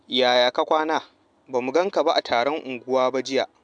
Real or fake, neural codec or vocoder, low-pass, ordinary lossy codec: real; none; 9.9 kHz; MP3, 96 kbps